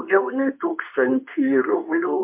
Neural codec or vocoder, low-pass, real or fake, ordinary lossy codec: codec, 24 kHz, 0.9 kbps, WavTokenizer, medium speech release version 2; 3.6 kHz; fake; MP3, 32 kbps